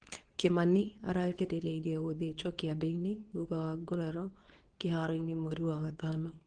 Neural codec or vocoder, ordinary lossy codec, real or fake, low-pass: codec, 24 kHz, 0.9 kbps, WavTokenizer, medium speech release version 2; Opus, 16 kbps; fake; 9.9 kHz